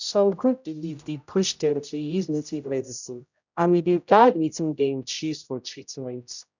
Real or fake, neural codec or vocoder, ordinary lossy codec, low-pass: fake; codec, 16 kHz, 0.5 kbps, X-Codec, HuBERT features, trained on general audio; none; 7.2 kHz